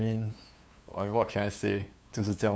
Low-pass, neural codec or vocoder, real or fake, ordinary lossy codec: none; codec, 16 kHz, 2 kbps, FunCodec, trained on LibriTTS, 25 frames a second; fake; none